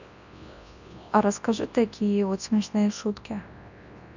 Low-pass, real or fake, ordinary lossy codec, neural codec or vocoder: 7.2 kHz; fake; none; codec, 24 kHz, 0.9 kbps, WavTokenizer, large speech release